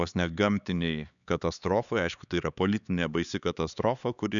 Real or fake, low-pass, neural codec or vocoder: fake; 7.2 kHz; codec, 16 kHz, 4 kbps, X-Codec, HuBERT features, trained on LibriSpeech